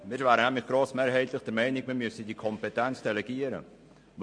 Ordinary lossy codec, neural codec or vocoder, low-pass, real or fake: MP3, 48 kbps; none; 9.9 kHz; real